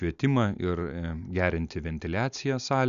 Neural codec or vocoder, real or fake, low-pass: none; real; 7.2 kHz